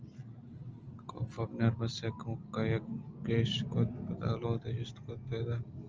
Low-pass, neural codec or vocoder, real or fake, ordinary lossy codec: 7.2 kHz; none; real; Opus, 24 kbps